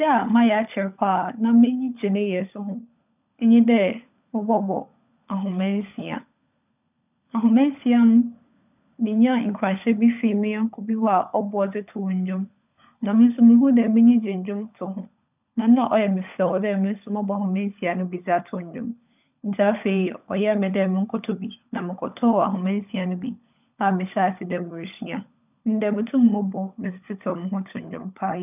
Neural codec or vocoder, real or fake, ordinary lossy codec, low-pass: codec, 16 kHz, 16 kbps, FunCodec, trained on LibriTTS, 50 frames a second; fake; none; 3.6 kHz